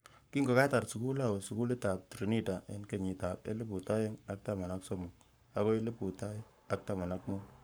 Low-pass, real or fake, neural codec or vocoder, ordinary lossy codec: none; fake; codec, 44.1 kHz, 7.8 kbps, Pupu-Codec; none